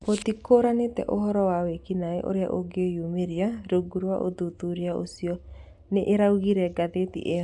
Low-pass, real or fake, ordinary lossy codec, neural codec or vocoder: 10.8 kHz; real; MP3, 96 kbps; none